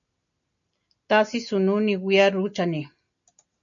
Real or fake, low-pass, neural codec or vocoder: real; 7.2 kHz; none